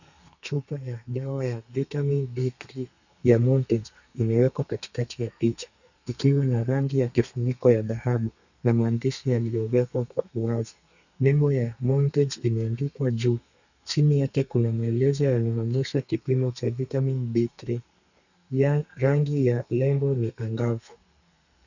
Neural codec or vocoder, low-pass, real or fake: codec, 32 kHz, 1.9 kbps, SNAC; 7.2 kHz; fake